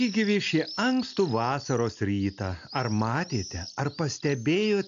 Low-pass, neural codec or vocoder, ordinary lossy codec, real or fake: 7.2 kHz; none; AAC, 64 kbps; real